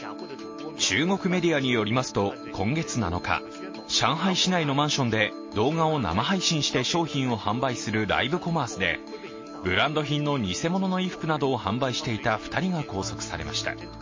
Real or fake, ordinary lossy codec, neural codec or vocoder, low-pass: real; MP3, 32 kbps; none; 7.2 kHz